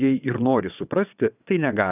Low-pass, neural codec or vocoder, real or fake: 3.6 kHz; vocoder, 22.05 kHz, 80 mel bands, WaveNeXt; fake